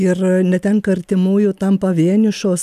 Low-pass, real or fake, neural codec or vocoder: 14.4 kHz; real; none